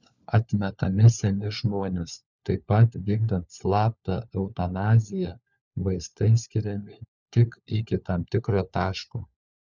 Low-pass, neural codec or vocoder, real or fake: 7.2 kHz; codec, 16 kHz, 4 kbps, FunCodec, trained on LibriTTS, 50 frames a second; fake